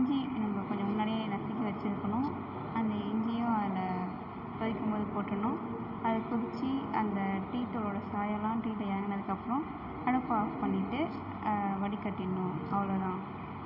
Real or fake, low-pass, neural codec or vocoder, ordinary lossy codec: real; 5.4 kHz; none; none